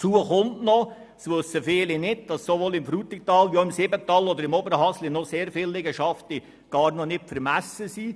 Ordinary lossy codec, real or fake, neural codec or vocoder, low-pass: none; real; none; none